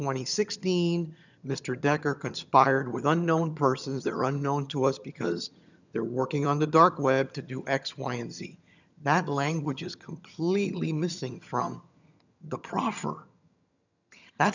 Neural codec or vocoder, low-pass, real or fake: vocoder, 22.05 kHz, 80 mel bands, HiFi-GAN; 7.2 kHz; fake